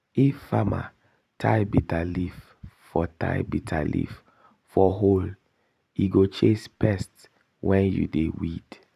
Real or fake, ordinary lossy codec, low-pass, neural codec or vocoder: fake; none; 14.4 kHz; vocoder, 44.1 kHz, 128 mel bands every 512 samples, BigVGAN v2